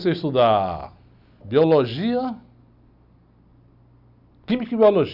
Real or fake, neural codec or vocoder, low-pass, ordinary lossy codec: real; none; 5.4 kHz; Opus, 64 kbps